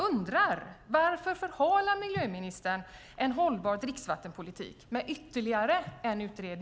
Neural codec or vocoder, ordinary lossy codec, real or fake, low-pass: none; none; real; none